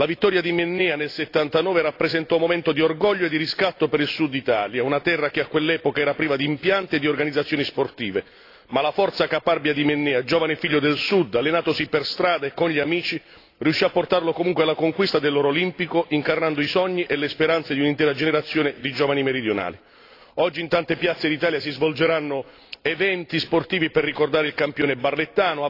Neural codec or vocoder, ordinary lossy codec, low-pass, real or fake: none; AAC, 32 kbps; 5.4 kHz; real